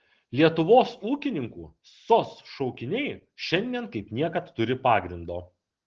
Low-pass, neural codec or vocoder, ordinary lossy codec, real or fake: 7.2 kHz; none; Opus, 16 kbps; real